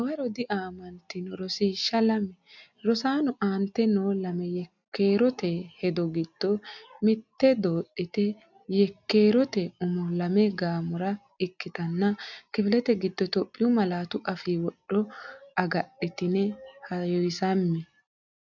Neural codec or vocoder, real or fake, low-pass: none; real; 7.2 kHz